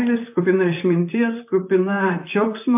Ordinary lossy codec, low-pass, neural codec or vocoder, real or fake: MP3, 32 kbps; 3.6 kHz; vocoder, 44.1 kHz, 128 mel bands, Pupu-Vocoder; fake